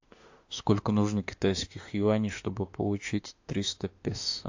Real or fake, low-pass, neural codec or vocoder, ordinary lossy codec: fake; 7.2 kHz; autoencoder, 48 kHz, 32 numbers a frame, DAC-VAE, trained on Japanese speech; AAC, 48 kbps